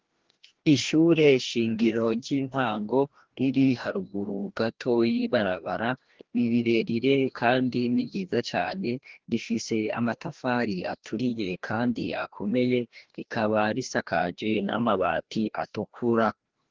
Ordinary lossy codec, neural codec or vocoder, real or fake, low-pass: Opus, 16 kbps; codec, 16 kHz, 1 kbps, FreqCodec, larger model; fake; 7.2 kHz